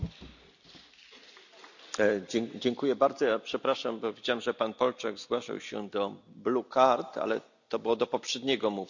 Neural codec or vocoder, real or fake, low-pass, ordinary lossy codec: none; real; 7.2 kHz; none